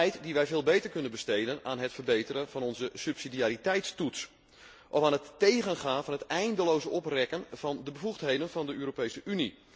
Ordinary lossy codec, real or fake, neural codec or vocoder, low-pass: none; real; none; none